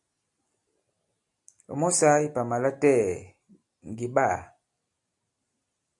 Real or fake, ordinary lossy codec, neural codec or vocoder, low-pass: real; AAC, 64 kbps; none; 10.8 kHz